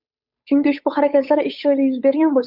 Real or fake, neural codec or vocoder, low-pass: fake; codec, 16 kHz, 8 kbps, FunCodec, trained on Chinese and English, 25 frames a second; 5.4 kHz